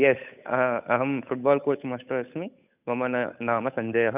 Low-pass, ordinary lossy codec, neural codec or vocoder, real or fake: 3.6 kHz; none; codec, 16 kHz, 8 kbps, FunCodec, trained on Chinese and English, 25 frames a second; fake